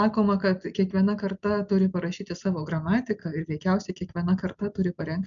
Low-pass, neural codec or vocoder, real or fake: 7.2 kHz; none; real